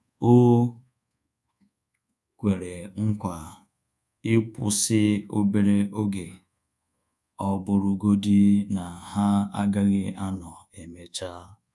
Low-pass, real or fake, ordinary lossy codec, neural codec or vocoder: none; fake; none; codec, 24 kHz, 1.2 kbps, DualCodec